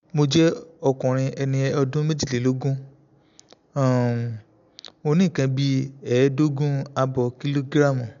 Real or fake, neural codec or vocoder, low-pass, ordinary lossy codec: real; none; 7.2 kHz; none